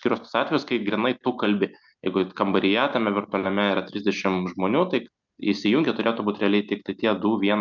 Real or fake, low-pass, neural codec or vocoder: real; 7.2 kHz; none